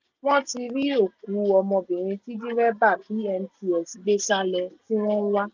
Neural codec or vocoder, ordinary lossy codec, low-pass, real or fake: none; none; 7.2 kHz; real